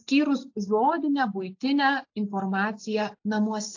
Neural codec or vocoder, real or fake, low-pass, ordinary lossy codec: none; real; 7.2 kHz; AAC, 48 kbps